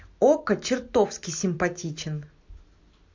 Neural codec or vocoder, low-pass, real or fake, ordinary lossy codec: none; 7.2 kHz; real; MP3, 48 kbps